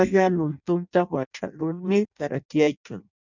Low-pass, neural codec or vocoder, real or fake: 7.2 kHz; codec, 16 kHz in and 24 kHz out, 0.6 kbps, FireRedTTS-2 codec; fake